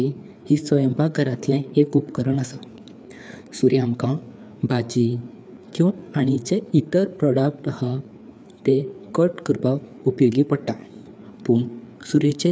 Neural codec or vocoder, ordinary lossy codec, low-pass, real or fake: codec, 16 kHz, 4 kbps, FreqCodec, larger model; none; none; fake